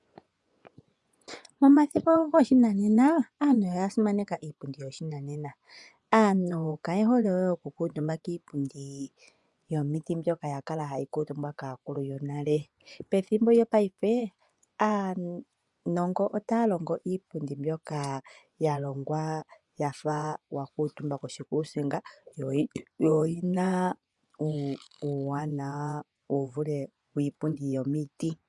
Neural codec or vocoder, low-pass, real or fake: vocoder, 24 kHz, 100 mel bands, Vocos; 10.8 kHz; fake